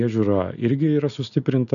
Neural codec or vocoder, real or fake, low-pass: none; real; 7.2 kHz